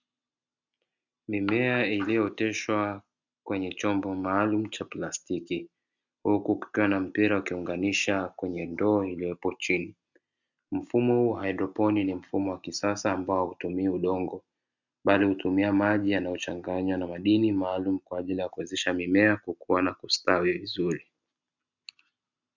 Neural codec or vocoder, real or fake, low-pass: none; real; 7.2 kHz